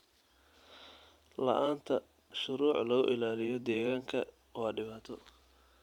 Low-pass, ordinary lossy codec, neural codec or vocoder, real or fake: 19.8 kHz; none; vocoder, 44.1 kHz, 128 mel bands every 512 samples, BigVGAN v2; fake